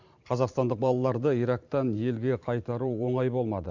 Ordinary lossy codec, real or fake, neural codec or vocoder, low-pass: Opus, 64 kbps; fake; vocoder, 44.1 kHz, 128 mel bands every 512 samples, BigVGAN v2; 7.2 kHz